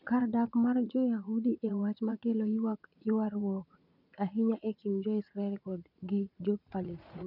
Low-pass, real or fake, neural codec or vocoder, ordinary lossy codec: 5.4 kHz; fake; vocoder, 44.1 kHz, 80 mel bands, Vocos; none